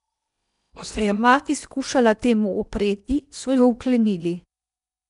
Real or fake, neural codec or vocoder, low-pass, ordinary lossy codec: fake; codec, 16 kHz in and 24 kHz out, 0.8 kbps, FocalCodec, streaming, 65536 codes; 10.8 kHz; none